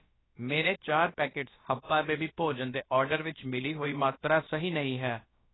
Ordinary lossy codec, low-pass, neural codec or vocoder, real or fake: AAC, 16 kbps; 7.2 kHz; codec, 16 kHz, about 1 kbps, DyCAST, with the encoder's durations; fake